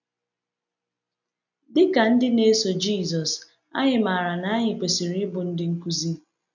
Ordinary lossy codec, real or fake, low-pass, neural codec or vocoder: none; real; 7.2 kHz; none